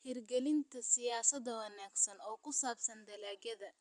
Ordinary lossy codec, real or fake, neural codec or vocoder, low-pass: MP3, 96 kbps; fake; vocoder, 44.1 kHz, 128 mel bands every 512 samples, BigVGAN v2; 10.8 kHz